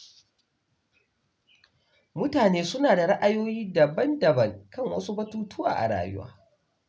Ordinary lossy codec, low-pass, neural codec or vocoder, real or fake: none; none; none; real